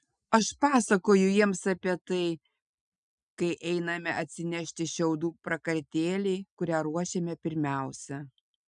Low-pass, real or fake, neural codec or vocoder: 9.9 kHz; real; none